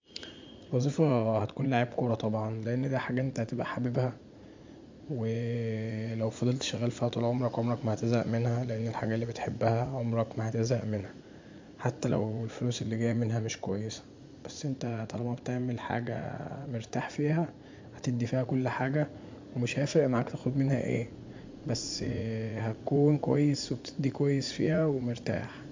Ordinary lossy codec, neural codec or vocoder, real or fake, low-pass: MP3, 64 kbps; vocoder, 44.1 kHz, 80 mel bands, Vocos; fake; 7.2 kHz